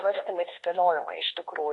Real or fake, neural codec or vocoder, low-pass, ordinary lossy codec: fake; codec, 24 kHz, 1.2 kbps, DualCodec; 10.8 kHz; AAC, 32 kbps